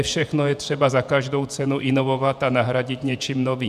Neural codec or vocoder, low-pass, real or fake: vocoder, 48 kHz, 128 mel bands, Vocos; 14.4 kHz; fake